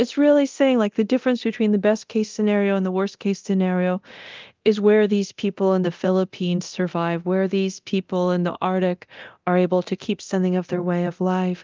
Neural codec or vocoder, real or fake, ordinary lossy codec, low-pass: codec, 24 kHz, 0.9 kbps, DualCodec; fake; Opus, 32 kbps; 7.2 kHz